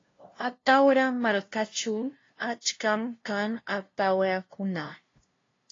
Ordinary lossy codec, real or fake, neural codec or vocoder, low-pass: AAC, 32 kbps; fake; codec, 16 kHz, 0.5 kbps, FunCodec, trained on LibriTTS, 25 frames a second; 7.2 kHz